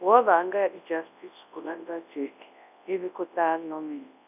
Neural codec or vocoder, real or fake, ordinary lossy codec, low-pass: codec, 24 kHz, 0.9 kbps, WavTokenizer, large speech release; fake; none; 3.6 kHz